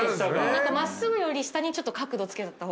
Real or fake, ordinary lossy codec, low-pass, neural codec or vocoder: real; none; none; none